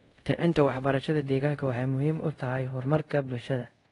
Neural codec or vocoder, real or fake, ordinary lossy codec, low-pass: codec, 24 kHz, 0.5 kbps, DualCodec; fake; AAC, 32 kbps; 10.8 kHz